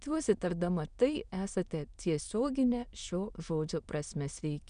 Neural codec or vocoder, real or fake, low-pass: autoencoder, 22.05 kHz, a latent of 192 numbers a frame, VITS, trained on many speakers; fake; 9.9 kHz